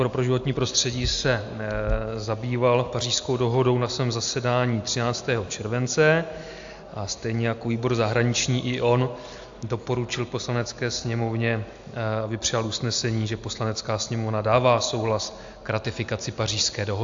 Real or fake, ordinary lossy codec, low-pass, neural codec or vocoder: real; AAC, 64 kbps; 7.2 kHz; none